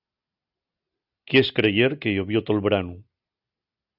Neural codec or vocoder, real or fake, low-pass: none; real; 5.4 kHz